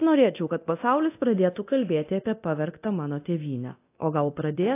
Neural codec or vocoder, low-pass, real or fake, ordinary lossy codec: codec, 24 kHz, 0.9 kbps, DualCodec; 3.6 kHz; fake; AAC, 24 kbps